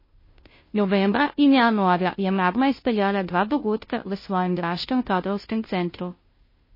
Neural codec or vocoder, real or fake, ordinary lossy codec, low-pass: codec, 16 kHz, 0.5 kbps, FunCodec, trained on Chinese and English, 25 frames a second; fake; MP3, 24 kbps; 5.4 kHz